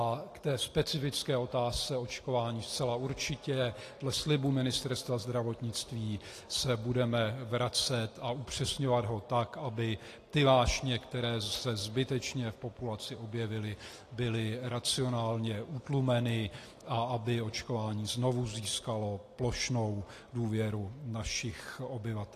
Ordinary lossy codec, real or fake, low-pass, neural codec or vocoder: AAC, 48 kbps; real; 14.4 kHz; none